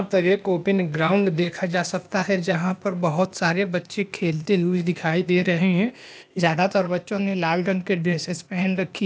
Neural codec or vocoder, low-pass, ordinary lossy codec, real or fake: codec, 16 kHz, 0.8 kbps, ZipCodec; none; none; fake